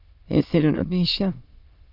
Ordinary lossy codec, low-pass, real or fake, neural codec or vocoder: Opus, 24 kbps; 5.4 kHz; fake; autoencoder, 22.05 kHz, a latent of 192 numbers a frame, VITS, trained on many speakers